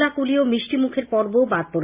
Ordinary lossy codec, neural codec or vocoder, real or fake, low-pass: Opus, 64 kbps; none; real; 3.6 kHz